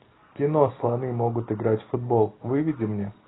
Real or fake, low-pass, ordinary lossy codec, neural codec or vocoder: real; 7.2 kHz; AAC, 16 kbps; none